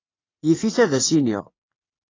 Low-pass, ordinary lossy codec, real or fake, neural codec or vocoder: 7.2 kHz; AAC, 32 kbps; fake; vocoder, 44.1 kHz, 80 mel bands, Vocos